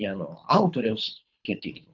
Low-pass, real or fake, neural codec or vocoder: 7.2 kHz; fake; codec, 24 kHz, 3 kbps, HILCodec